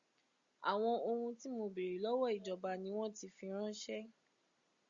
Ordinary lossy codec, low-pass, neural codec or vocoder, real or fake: Opus, 64 kbps; 7.2 kHz; none; real